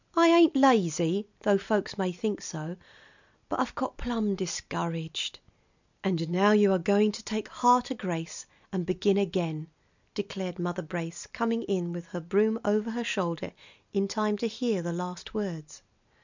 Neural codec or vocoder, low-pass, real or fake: none; 7.2 kHz; real